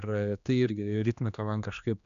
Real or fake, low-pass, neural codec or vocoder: fake; 7.2 kHz; codec, 16 kHz, 2 kbps, X-Codec, HuBERT features, trained on general audio